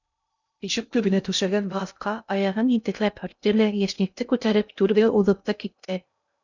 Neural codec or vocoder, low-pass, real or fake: codec, 16 kHz in and 24 kHz out, 0.6 kbps, FocalCodec, streaming, 2048 codes; 7.2 kHz; fake